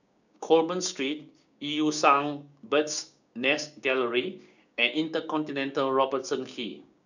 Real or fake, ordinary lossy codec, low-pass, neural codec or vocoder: fake; none; 7.2 kHz; codec, 16 kHz, 6 kbps, DAC